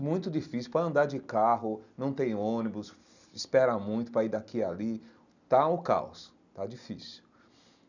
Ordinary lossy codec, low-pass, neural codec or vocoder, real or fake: none; 7.2 kHz; none; real